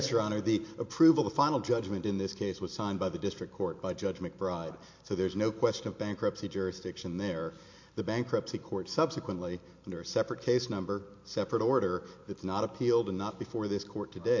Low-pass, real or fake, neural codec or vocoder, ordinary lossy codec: 7.2 kHz; real; none; MP3, 48 kbps